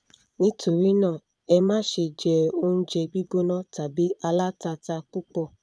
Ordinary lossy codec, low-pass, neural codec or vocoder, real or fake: none; none; vocoder, 22.05 kHz, 80 mel bands, Vocos; fake